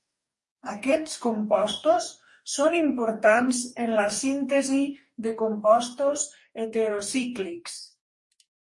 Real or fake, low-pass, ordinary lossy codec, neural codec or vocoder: fake; 10.8 kHz; MP3, 48 kbps; codec, 44.1 kHz, 2.6 kbps, DAC